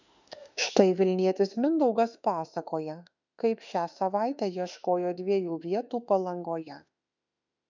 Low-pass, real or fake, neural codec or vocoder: 7.2 kHz; fake; autoencoder, 48 kHz, 32 numbers a frame, DAC-VAE, trained on Japanese speech